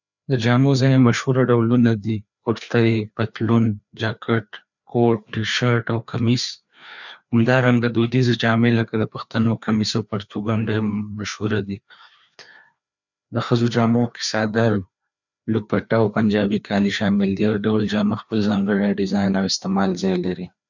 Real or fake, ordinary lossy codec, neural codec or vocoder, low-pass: fake; none; codec, 16 kHz, 2 kbps, FreqCodec, larger model; 7.2 kHz